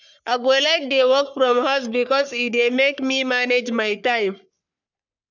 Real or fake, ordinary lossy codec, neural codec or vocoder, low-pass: fake; none; codec, 44.1 kHz, 3.4 kbps, Pupu-Codec; 7.2 kHz